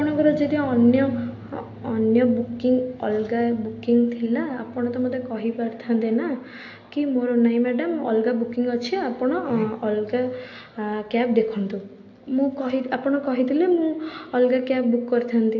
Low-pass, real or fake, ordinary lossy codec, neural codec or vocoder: 7.2 kHz; real; AAC, 48 kbps; none